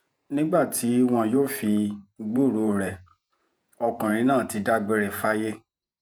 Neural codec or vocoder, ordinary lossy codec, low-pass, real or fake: vocoder, 48 kHz, 128 mel bands, Vocos; none; none; fake